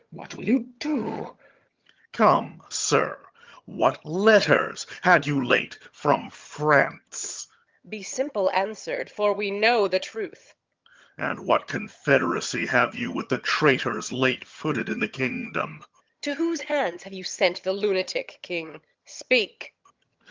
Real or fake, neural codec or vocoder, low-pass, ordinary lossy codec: fake; vocoder, 22.05 kHz, 80 mel bands, HiFi-GAN; 7.2 kHz; Opus, 32 kbps